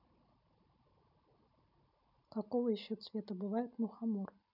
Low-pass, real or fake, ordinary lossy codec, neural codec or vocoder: 5.4 kHz; real; none; none